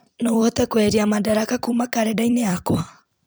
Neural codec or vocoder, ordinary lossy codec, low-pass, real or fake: vocoder, 44.1 kHz, 128 mel bands every 256 samples, BigVGAN v2; none; none; fake